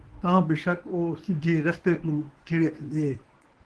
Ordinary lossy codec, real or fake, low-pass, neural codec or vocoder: Opus, 16 kbps; fake; 10.8 kHz; codec, 24 kHz, 0.9 kbps, WavTokenizer, medium speech release version 1